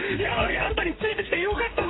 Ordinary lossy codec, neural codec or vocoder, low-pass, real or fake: AAC, 16 kbps; codec, 32 kHz, 1.9 kbps, SNAC; 7.2 kHz; fake